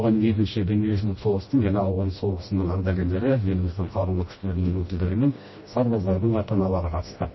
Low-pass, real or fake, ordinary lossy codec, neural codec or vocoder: 7.2 kHz; fake; MP3, 24 kbps; codec, 16 kHz, 1 kbps, FreqCodec, smaller model